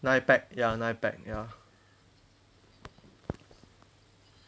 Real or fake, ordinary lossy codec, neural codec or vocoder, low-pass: real; none; none; none